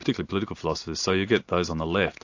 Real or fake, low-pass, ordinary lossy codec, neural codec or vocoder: real; 7.2 kHz; AAC, 48 kbps; none